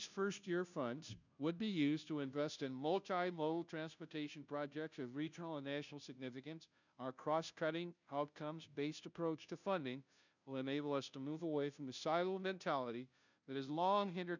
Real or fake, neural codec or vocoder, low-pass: fake; codec, 16 kHz, 0.5 kbps, FunCodec, trained on Chinese and English, 25 frames a second; 7.2 kHz